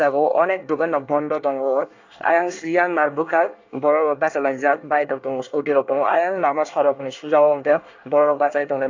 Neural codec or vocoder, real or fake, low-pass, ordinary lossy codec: codec, 24 kHz, 1 kbps, SNAC; fake; 7.2 kHz; AAC, 48 kbps